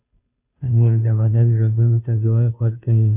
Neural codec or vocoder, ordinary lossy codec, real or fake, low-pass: codec, 16 kHz, 0.5 kbps, FunCodec, trained on Chinese and English, 25 frames a second; Opus, 64 kbps; fake; 3.6 kHz